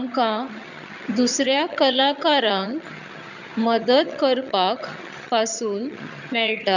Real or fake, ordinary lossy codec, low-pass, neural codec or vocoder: fake; none; 7.2 kHz; vocoder, 22.05 kHz, 80 mel bands, HiFi-GAN